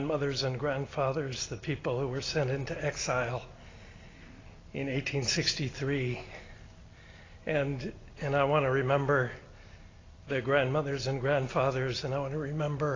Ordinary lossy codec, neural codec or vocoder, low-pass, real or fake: AAC, 32 kbps; none; 7.2 kHz; real